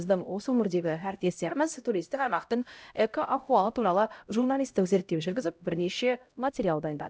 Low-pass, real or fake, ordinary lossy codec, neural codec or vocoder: none; fake; none; codec, 16 kHz, 0.5 kbps, X-Codec, HuBERT features, trained on LibriSpeech